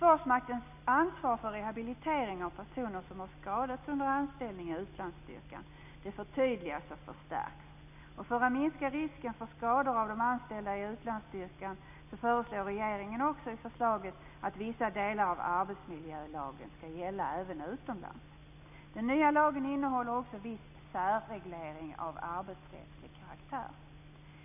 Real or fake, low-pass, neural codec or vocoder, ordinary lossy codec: real; 3.6 kHz; none; none